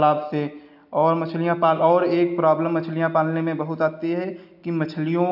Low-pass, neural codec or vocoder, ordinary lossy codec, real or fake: 5.4 kHz; none; AAC, 48 kbps; real